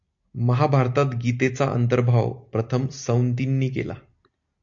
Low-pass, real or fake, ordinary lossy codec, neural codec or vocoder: 7.2 kHz; real; AAC, 64 kbps; none